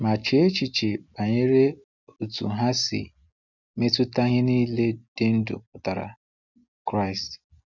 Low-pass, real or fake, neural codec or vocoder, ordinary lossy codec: 7.2 kHz; real; none; none